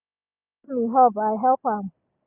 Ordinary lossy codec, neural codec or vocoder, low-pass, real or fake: none; none; 3.6 kHz; real